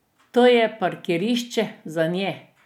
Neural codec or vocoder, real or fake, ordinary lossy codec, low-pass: none; real; none; 19.8 kHz